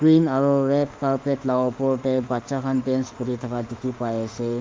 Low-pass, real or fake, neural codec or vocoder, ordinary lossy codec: 7.2 kHz; fake; autoencoder, 48 kHz, 32 numbers a frame, DAC-VAE, trained on Japanese speech; Opus, 32 kbps